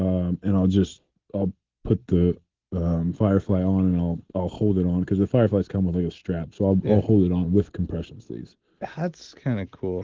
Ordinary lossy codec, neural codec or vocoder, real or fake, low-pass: Opus, 16 kbps; none; real; 7.2 kHz